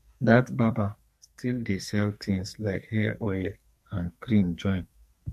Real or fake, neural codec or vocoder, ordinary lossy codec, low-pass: fake; codec, 44.1 kHz, 2.6 kbps, SNAC; MP3, 64 kbps; 14.4 kHz